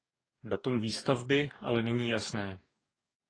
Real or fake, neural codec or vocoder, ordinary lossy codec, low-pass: fake; codec, 44.1 kHz, 2.6 kbps, DAC; AAC, 32 kbps; 9.9 kHz